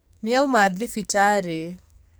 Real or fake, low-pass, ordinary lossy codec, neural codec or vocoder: fake; none; none; codec, 44.1 kHz, 2.6 kbps, SNAC